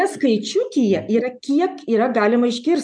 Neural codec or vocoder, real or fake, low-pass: none; real; 9.9 kHz